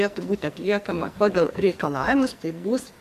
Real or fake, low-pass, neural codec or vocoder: fake; 14.4 kHz; codec, 44.1 kHz, 2.6 kbps, SNAC